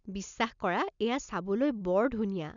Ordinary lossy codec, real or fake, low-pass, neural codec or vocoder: none; real; 7.2 kHz; none